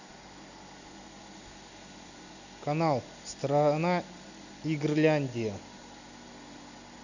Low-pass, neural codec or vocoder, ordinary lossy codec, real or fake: 7.2 kHz; none; none; real